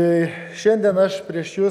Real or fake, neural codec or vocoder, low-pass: real; none; 19.8 kHz